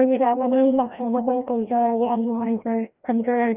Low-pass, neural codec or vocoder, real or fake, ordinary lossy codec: 3.6 kHz; codec, 16 kHz, 1 kbps, FreqCodec, larger model; fake; none